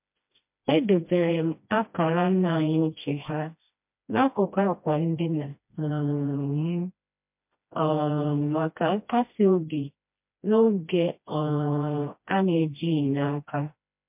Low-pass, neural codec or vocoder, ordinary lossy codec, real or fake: 3.6 kHz; codec, 16 kHz, 1 kbps, FreqCodec, smaller model; MP3, 32 kbps; fake